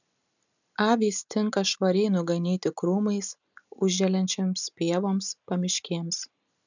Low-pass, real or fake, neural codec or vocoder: 7.2 kHz; real; none